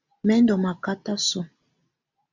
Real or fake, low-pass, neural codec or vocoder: real; 7.2 kHz; none